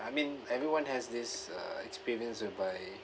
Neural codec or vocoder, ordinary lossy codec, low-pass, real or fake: none; none; none; real